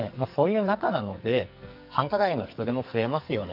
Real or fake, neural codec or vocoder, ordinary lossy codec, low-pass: fake; codec, 44.1 kHz, 2.6 kbps, SNAC; none; 5.4 kHz